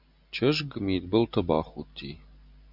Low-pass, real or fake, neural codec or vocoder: 5.4 kHz; real; none